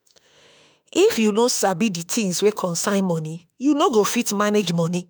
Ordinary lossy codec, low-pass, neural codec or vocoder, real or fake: none; none; autoencoder, 48 kHz, 32 numbers a frame, DAC-VAE, trained on Japanese speech; fake